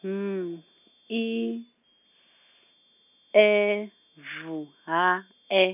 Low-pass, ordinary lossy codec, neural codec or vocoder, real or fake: 3.6 kHz; none; none; real